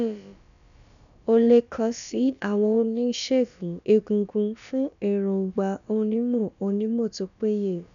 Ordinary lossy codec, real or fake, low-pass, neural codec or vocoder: none; fake; 7.2 kHz; codec, 16 kHz, about 1 kbps, DyCAST, with the encoder's durations